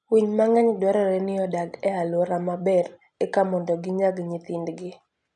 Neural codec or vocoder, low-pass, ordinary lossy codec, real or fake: none; 9.9 kHz; none; real